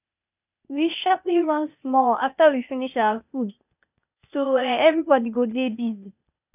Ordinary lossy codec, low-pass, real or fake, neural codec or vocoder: none; 3.6 kHz; fake; codec, 16 kHz, 0.8 kbps, ZipCodec